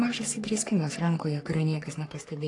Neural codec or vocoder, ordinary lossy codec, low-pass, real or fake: codec, 44.1 kHz, 3.4 kbps, Pupu-Codec; AAC, 48 kbps; 10.8 kHz; fake